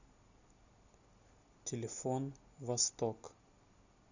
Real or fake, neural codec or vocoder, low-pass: real; none; 7.2 kHz